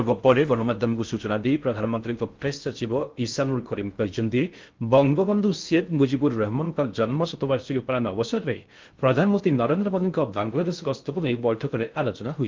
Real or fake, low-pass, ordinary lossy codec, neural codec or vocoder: fake; 7.2 kHz; Opus, 32 kbps; codec, 16 kHz in and 24 kHz out, 0.6 kbps, FocalCodec, streaming, 4096 codes